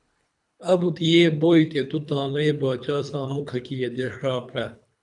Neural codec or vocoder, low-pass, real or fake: codec, 24 kHz, 3 kbps, HILCodec; 10.8 kHz; fake